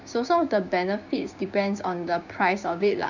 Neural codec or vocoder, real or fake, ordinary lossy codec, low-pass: codec, 16 kHz, 6 kbps, DAC; fake; none; 7.2 kHz